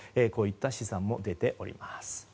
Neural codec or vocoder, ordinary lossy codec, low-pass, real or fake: none; none; none; real